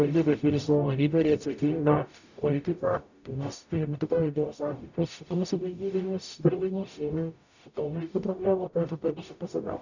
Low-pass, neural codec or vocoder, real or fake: 7.2 kHz; codec, 44.1 kHz, 0.9 kbps, DAC; fake